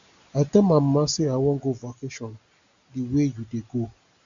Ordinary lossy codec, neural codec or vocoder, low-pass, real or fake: Opus, 64 kbps; none; 7.2 kHz; real